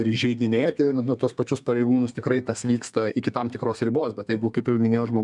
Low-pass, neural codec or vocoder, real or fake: 10.8 kHz; codec, 32 kHz, 1.9 kbps, SNAC; fake